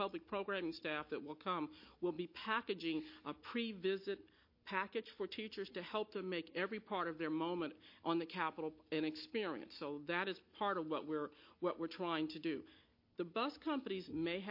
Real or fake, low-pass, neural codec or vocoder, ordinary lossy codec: real; 5.4 kHz; none; MP3, 32 kbps